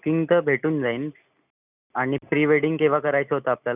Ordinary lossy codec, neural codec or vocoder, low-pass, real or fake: none; none; 3.6 kHz; real